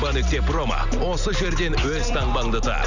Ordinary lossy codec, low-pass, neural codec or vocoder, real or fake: none; 7.2 kHz; none; real